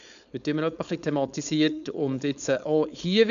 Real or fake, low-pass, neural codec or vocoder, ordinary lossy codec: fake; 7.2 kHz; codec, 16 kHz, 4.8 kbps, FACodec; none